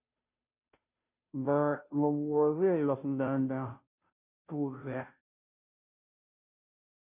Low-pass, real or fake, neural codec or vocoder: 3.6 kHz; fake; codec, 16 kHz, 0.5 kbps, FunCodec, trained on Chinese and English, 25 frames a second